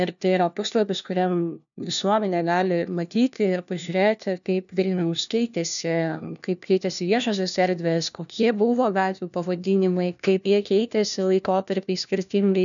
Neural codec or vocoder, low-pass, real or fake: codec, 16 kHz, 1 kbps, FunCodec, trained on LibriTTS, 50 frames a second; 7.2 kHz; fake